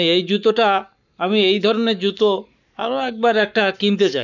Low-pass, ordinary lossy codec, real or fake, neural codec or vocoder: 7.2 kHz; none; fake; codec, 44.1 kHz, 7.8 kbps, Pupu-Codec